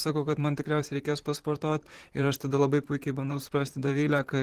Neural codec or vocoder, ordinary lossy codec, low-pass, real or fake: vocoder, 44.1 kHz, 128 mel bands, Pupu-Vocoder; Opus, 16 kbps; 14.4 kHz; fake